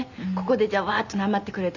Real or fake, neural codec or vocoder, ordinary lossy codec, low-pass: real; none; none; 7.2 kHz